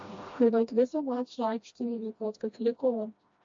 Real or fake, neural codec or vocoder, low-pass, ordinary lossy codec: fake; codec, 16 kHz, 1 kbps, FreqCodec, smaller model; 7.2 kHz; MP3, 48 kbps